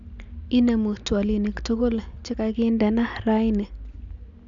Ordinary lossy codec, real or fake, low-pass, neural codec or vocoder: none; real; 7.2 kHz; none